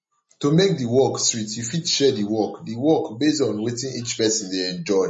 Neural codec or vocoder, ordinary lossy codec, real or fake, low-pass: none; MP3, 32 kbps; real; 10.8 kHz